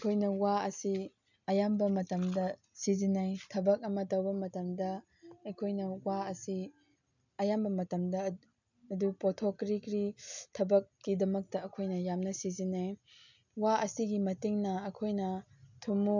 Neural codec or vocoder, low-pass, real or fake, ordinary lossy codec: none; 7.2 kHz; real; none